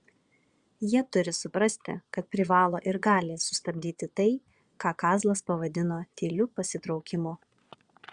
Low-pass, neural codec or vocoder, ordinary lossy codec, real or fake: 9.9 kHz; vocoder, 22.05 kHz, 80 mel bands, Vocos; Opus, 64 kbps; fake